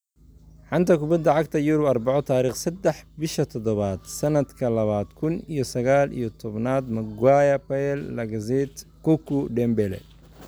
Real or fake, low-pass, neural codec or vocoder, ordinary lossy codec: real; none; none; none